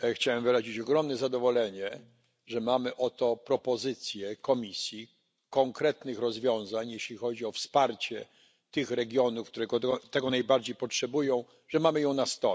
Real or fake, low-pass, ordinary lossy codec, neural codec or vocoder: real; none; none; none